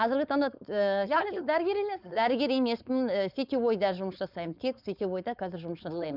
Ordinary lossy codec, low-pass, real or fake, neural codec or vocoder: none; 5.4 kHz; fake; codec, 16 kHz, 4.8 kbps, FACodec